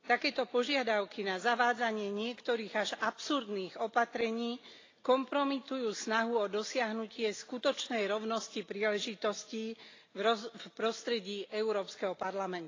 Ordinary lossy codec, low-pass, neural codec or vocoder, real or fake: AAC, 32 kbps; 7.2 kHz; none; real